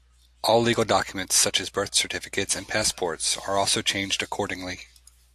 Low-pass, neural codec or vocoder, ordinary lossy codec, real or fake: 14.4 kHz; none; AAC, 64 kbps; real